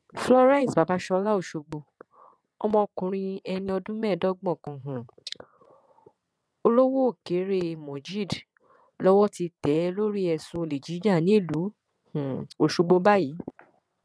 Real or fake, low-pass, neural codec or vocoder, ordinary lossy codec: fake; none; vocoder, 22.05 kHz, 80 mel bands, WaveNeXt; none